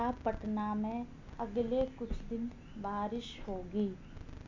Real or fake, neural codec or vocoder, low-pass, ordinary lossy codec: real; none; 7.2 kHz; MP3, 64 kbps